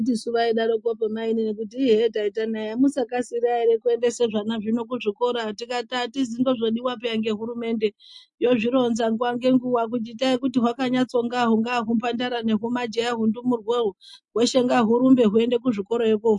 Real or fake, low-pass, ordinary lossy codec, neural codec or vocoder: real; 10.8 kHz; MP3, 48 kbps; none